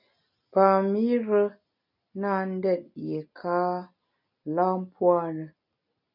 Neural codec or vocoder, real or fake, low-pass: none; real; 5.4 kHz